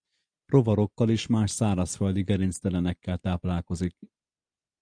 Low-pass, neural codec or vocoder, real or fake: 9.9 kHz; none; real